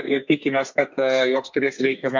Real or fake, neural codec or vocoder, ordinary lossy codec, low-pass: fake; codec, 32 kHz, 1.9 kbps, SNAC; MP3, 48 kbps; 7.2 kHz